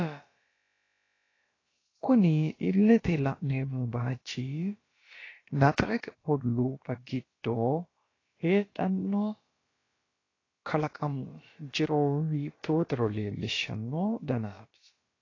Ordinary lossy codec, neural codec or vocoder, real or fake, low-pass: AAC, 32 kbps; codec, 16 kHz, about 1 kbps, DyCAST, with the encoder's durations; fake; 7.2 kHz